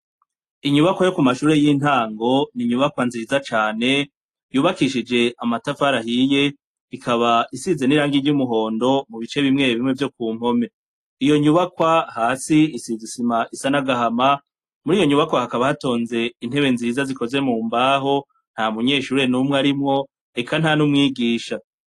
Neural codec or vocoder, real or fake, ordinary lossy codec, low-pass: none; real; AAC, 48 kbps; 14.4 kHz